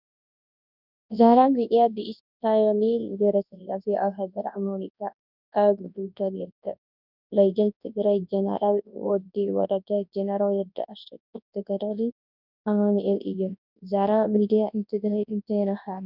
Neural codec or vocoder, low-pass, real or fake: codec, 24 kHz, 0.9 kbps, WavTokenizer, large speech release; 5.4 kHz; fake